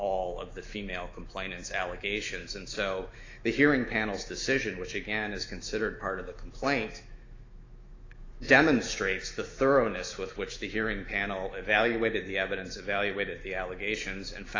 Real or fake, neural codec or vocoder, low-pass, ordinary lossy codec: fake; autoencoder, 48 kHz, 128 numbers a frame, DAC-VAE, trained on Japanese speech; 7.2 kHz; AAC, 32 kbps